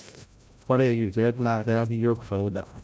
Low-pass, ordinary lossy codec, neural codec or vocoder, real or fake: none; none; codec, 16 kHz, 0.5 kbps, FreqCodec, larger model; fake